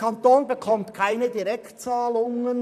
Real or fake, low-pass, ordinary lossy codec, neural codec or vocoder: fake; 14.4 kHz; none; vocoder, 44.1 kHz, 128 mel bands, Pupu-Vocoder